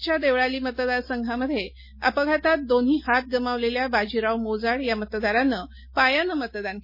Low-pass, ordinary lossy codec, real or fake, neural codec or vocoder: 5.4 kHz; MP3, 24 kbps; real; none